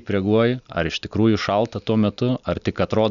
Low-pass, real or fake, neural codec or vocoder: 7.2 kHz; real; none